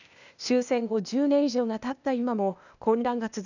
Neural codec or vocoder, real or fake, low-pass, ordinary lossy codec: codec, 16 kHz, 0.8 kbps, ZipCodec; fake; 7.2 kHz; none